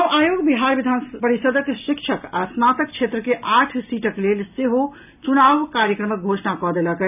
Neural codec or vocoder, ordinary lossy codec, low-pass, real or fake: none; none; 3.6 kHz; real